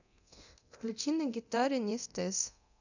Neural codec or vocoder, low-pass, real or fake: codec, 24 kHz, 0.9 kbps, DualCodec; 7.2 kHz; fake